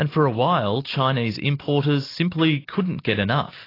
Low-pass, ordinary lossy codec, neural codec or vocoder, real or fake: 5.4 kHz; AAC, 24 kbps; none; real